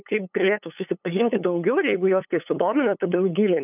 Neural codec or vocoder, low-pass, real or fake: codec, 16 kHz, 8 kbps, FunCodec, trained on LibriTTS, 25 frames a second; 3.6 kHz; fake